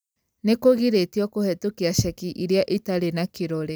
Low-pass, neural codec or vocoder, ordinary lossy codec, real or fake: none; none; none; real